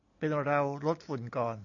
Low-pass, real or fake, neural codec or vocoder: 7.2 kHz; real; none